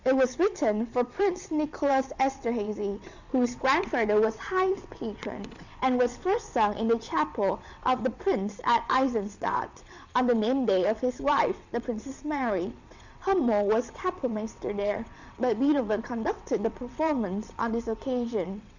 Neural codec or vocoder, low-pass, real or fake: vocoder, 22.05 kHz, 80 mel bands, WaveNeXt; 7.2 kHz; fake